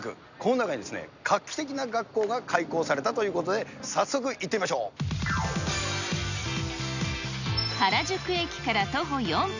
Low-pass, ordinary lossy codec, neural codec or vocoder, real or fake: 7.2 kHz; none; none; real